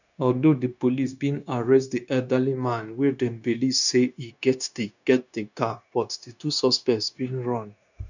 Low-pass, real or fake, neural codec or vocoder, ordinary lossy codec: 7.2 kHz; fake; codec, 16 kHz, 0.9 kbps, LongCat-Audio-Codec; none